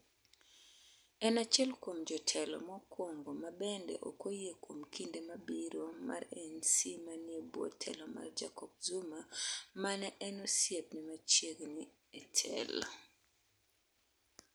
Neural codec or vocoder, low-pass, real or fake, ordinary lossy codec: none; none; real; none